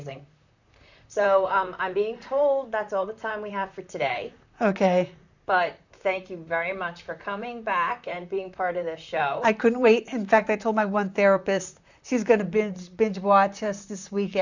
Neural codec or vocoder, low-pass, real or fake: vocoder, 44.1 kHz, 128 mel bands, Pupu-Vocoder; 7.2 kHz; fake